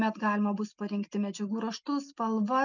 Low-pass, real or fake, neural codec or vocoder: 7.2 kHz; real; none